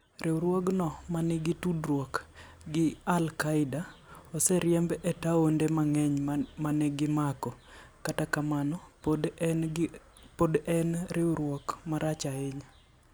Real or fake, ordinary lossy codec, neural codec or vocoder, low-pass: real; none; none; none